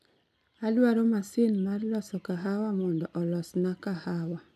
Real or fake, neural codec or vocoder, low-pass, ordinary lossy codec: real; none; 14.4 kHz; none